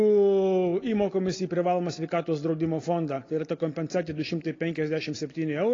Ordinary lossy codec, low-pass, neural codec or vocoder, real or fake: AAC, 32 kbps; 7.2 kHz; none; real